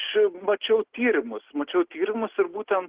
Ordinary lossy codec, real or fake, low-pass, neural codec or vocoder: Opus, 16 kbps; real; 3.6 kHz; none